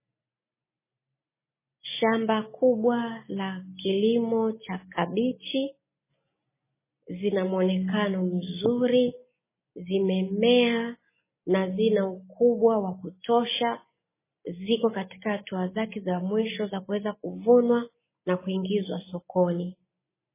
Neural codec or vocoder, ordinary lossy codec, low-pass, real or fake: none; MP3, 16 kbps; 3.6 kHz; real